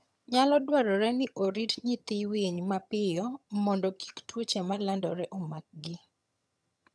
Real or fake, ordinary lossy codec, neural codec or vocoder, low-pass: fake; none; vocoder, 22.05 kHz, 80 mel bands, HiFi-GAN; none